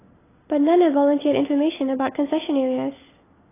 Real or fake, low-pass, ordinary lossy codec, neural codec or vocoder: real; 3.6 kHz; AAC, 16 kbps; none